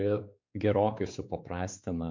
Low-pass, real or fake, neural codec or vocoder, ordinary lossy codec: 7.2 kHz; fake; codec, 16 kHz, 4 kbps, X-Codec, WavLM features, trained on Multilingual LibriSpeech; MP3, 64 kbps